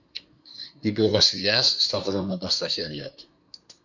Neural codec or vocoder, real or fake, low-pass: codec, 24 kHz, 1 kbps, SNAC; fake; 7.2 kHz